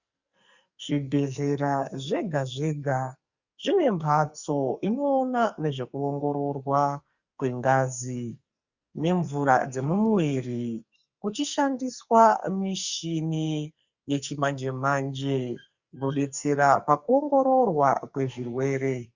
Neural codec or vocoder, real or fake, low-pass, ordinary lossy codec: codec, 44.1 kHz, 2.6 kbps, SNAC; fake; 7.2 kHz; Opus, 64 kbps